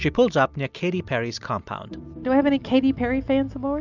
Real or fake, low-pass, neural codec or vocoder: real; 7.2 kHz; none